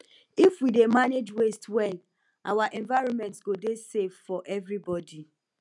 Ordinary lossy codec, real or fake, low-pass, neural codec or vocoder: none; real; 10.8 kHz; none